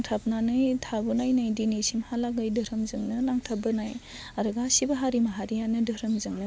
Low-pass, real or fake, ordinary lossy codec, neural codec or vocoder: none; real; none; none